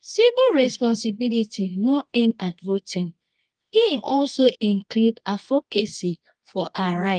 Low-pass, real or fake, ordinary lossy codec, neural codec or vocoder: 9.9 kHz; fake; Opus, 32 kbps; codec, 24 kHz, 0.9 kbps, WavTokenizer, medium music audio release